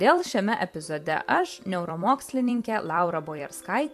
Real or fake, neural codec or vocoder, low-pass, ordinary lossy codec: fake; vocoder, 44.1 kHz, 128 mel bands every 256 samples, BigVGAN v2; 14.4 kHz; AAC, 96 kbps